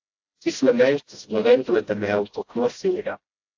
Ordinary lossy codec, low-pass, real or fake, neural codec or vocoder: AAC, 48 kbps; 7.2 kHz; fake; codec, 16 kHz, 0.5 kbps, FreqCodec, smaller model